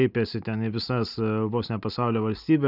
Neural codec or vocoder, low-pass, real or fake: none; 5.4 kHz; real